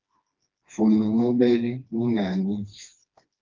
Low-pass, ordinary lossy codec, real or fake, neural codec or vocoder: 7.2 kHz; Opus, 24 kbps; fake; codec, 16 kHz, 2 kbps, FreqCodec, smaller model